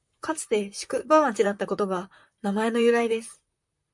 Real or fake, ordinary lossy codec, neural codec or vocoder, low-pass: fake; MP3, 64 kbps; vocoder, 44.1 kHz, 128 mel bands, Pupu-Vocoder; 10.8 kHz